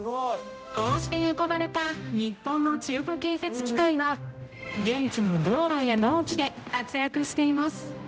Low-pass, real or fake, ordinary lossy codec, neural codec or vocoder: none; fake; none; codec, 16 kHz, 0.5 kbps, X-Codec, HuBERT features, trained on general audio